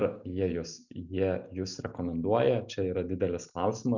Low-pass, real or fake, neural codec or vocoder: 7.2 kHz; real; none